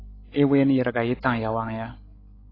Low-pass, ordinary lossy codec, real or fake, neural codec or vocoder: 5.4 kHz; AAC, 24 kbps; fake; codec, 16 kHz, 16 kbps, FreqCodec, larger model